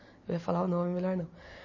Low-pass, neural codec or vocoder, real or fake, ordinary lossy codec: 7.2 kHz; none; real; MP3, 32 kbps